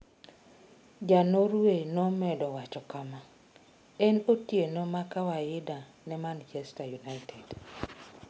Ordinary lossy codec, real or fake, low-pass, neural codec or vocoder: none; real; none; none